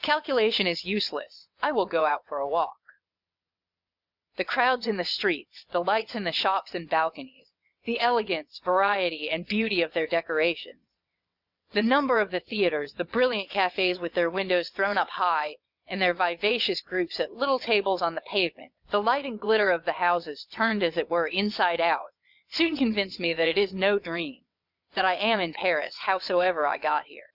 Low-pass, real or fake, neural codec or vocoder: 5.4 kHz; fake; vocoder, 22.05 kHz, 80 mel bands, WaveNeXt